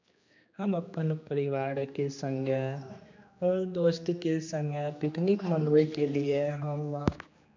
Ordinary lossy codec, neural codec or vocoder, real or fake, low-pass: MP3, 64 kbps; codec, 16 kHz, 2 kbps, X-Codec, HuBERT features, trained on general audio; fake; 7.2 kHz